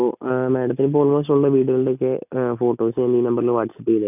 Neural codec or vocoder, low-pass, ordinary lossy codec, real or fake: none; 3.6 kHz; none; real